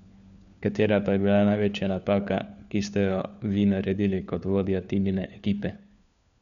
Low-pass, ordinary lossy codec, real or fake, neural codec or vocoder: 7.2 kHz; none; fake; codec, 16 kHz, 4 kbps, FunCodec, trained on LibriTTS, 50 frames a second